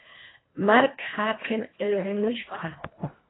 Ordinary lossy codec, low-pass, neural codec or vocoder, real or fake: AAC, 16 kbps; 7.2 kHz; codec, 24 kHz, 1.5 kbps, HILCodec; fake